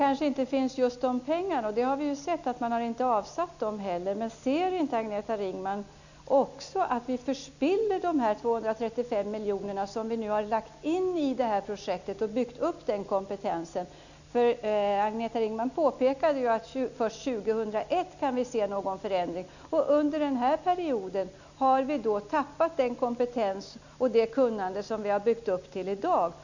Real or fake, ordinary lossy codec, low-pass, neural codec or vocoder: real; none; 7.2 kHz; none